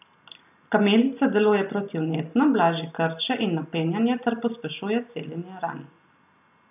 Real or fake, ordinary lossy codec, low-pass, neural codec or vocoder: real; none; 3.6 kHz; none